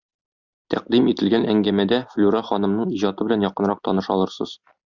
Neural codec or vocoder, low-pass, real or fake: none; 7.2 kHz; real